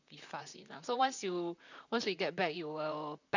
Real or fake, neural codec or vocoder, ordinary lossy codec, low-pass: fake; vocoder, 44.1 kHz, 128 mel bands, Pupu-Vocoder; none; 7.2 kHz